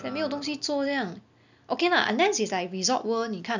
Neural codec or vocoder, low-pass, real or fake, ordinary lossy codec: none; 7.2 kHz; real; none